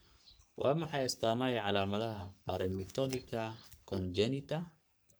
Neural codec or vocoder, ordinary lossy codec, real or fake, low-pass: codec, 44.1 kHz, 3.4 kbps, Pupu-Codec; none; fake; none